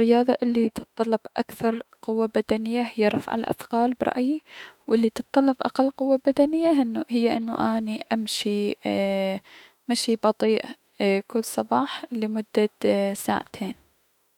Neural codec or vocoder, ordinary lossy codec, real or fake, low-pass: autoencoder, 48 kHz, 32 numbers a frame, DAC-VAE, trained on Japanese speech; none; fake; 19.8 kHz